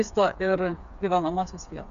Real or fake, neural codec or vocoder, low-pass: fake; codec, 16 kHz, 4 kbps, FreqCodec, smaller model; 7.2 kHz